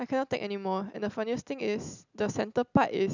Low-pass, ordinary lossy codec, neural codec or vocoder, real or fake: 7.2 kHz; none; none; real